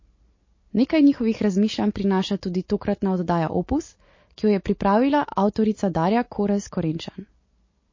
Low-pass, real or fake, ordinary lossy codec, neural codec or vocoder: 7.2 kHz; real; MP3, 32 kbps; none